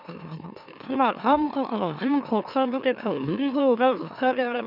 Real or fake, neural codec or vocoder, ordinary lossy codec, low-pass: fake; autoencoder, 44.1 kHz, a latent of 192 numbers a frame, MeloTTS; none; 5.4 kHz